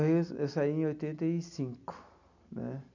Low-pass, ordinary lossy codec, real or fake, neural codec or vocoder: 7.2 kHz; none; real; none